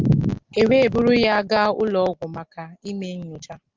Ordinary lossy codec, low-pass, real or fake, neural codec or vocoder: Opus, 32 kbps; 7.2 kHz; real; none